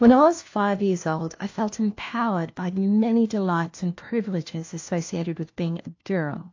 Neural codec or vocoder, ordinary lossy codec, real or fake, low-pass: codec, 16 kHz, 1 kbps, FunCodec, trained on LibriTTS, 50 frames a second; AAC, 48 kbps; fake; 7.2 kHz